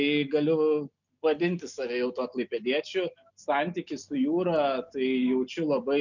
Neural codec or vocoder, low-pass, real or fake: none; 7.2 kHz; real